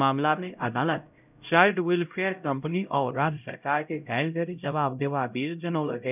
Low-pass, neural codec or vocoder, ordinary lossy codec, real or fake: 3.6 kHz; codec, 16 kHz, 0.5 kbps, X-Codec, HuBERT features, trained on LibriSpeech; none; fake